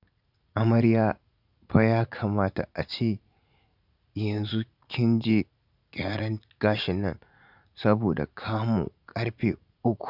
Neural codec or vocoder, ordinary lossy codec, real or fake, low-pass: vocoder, 44.1 kHz, 80 mel bands, Vocos; none; fake; 5.4 kHz